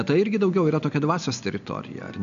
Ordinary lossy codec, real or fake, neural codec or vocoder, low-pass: AAC, 96 kbps; real; none; 7.2 kHz